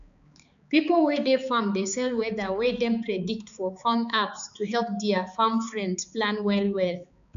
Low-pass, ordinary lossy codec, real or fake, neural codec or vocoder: 7.2 kHz; none; fake; codec, 16 kHz, 4 kbps, X-Codec, HuBERT features, trained on balanced general audio